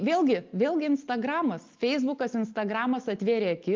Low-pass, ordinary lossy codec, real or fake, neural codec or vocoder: 7.2 kHz; Opus, 24 kbps; real; none